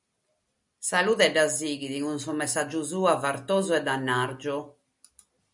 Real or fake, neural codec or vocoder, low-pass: real; none; 10.8 kHz